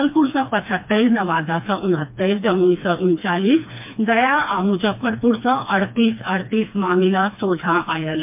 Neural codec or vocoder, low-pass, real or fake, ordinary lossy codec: codec, 16 kHz, 2 kbps, FreqCodec, smaller model; 3.6 kHz; fake; MP3, 32 kbps